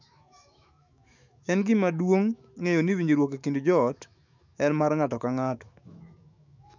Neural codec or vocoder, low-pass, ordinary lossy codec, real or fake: autoencoder, 48 kHz, 128 numbers a frame, DAC-VAE, trained on Japanese speech; 7.2 kHz; none; fake